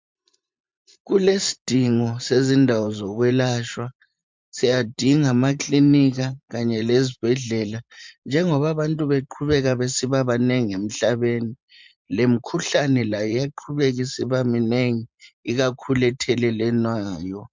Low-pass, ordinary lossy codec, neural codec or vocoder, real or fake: 7.2 kHz; MP3, 64 kbps; vocoder, 44.1 kHz, 128 mel bands every 512 samples, BigVGAN v2; fake